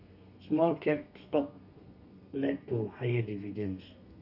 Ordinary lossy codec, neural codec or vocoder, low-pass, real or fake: none; codec, 32 kHz, 1.9 kbps, SNAC; 5.4 kHz; fake